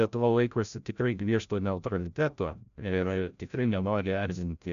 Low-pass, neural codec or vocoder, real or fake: 7.2 kHz; codec, 16 kHz, 0.5 kbps, FreqCodec, larger model; fake